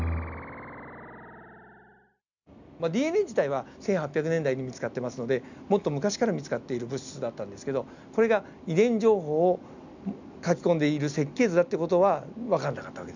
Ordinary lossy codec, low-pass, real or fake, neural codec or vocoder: none; 7.2 kHz; real; none